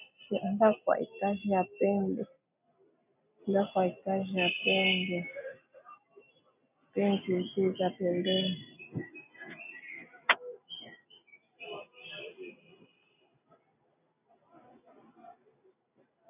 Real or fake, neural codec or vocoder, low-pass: real; none; 3.6 kHz